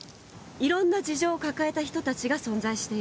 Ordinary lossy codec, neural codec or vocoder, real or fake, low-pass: none; none; real; none